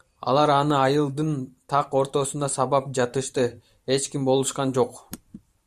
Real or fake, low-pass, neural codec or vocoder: fake; 14.4 kHz; vocoder, 44.1 kHz, 128 mel bands every 512 samples, BigVGAN v2